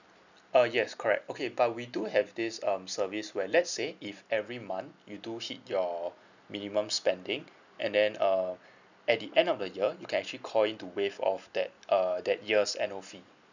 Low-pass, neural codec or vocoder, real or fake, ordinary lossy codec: 7.2 kHz; none; real; none